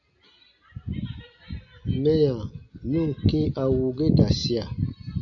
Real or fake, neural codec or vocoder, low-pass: real; none; 7.2 kHz